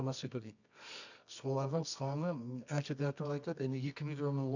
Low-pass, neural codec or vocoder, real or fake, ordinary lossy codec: 7.2 kHz; codec, 24 kHz, 0.9 kbps, WavTokenizer, medium music audio release; fake; none